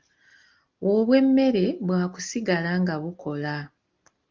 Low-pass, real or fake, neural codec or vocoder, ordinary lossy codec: 7.2 kHz; real; none; Opus, 32 kbps